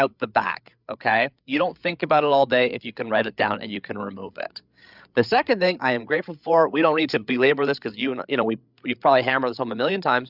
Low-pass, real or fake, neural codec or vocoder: 5.4 kHz; fake; codec, 16 kHz, 8 kbps, FreqCodec, larger model